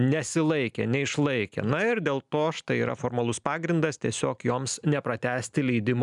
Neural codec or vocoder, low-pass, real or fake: none; 10.8 kHz; real